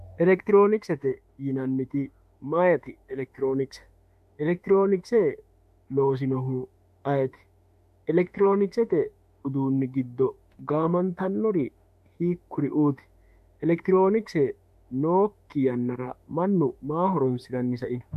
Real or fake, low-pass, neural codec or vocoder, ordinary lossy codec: fake; 14.4 kHz; autoencoder, 48 kHz, 32 numbers a frame, DAC-VAE, trained on Japanese speech; MP3, 64 kbps